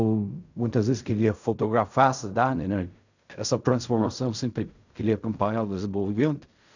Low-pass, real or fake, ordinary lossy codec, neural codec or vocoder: 7.2 kHz; fake; none; codec, 16 kHz in and 24 kHz out, 0.4 kbps, LongCat-Audio-Codec, fine tuned four codebook decoder